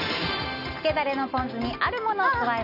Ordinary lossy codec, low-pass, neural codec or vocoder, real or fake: none; 5.4 kHz; none; real